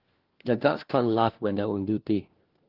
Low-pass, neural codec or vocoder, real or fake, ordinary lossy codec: 5.4 kHz; codec, 16 kHz, 1 kbps, FunCodec, trained on LibriTTS, 50 frames a second; fake; Opus, 16 kbps